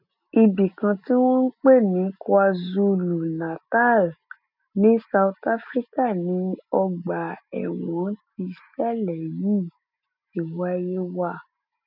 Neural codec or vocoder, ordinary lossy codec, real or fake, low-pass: none; none; real; 5.4 kHz